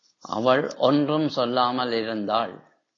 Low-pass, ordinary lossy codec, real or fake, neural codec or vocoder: 7.2 kHz; AAC, 32 kbps; real; none